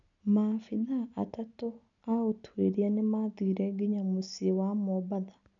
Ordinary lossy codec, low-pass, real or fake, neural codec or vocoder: none; 7.2 kHz; real; none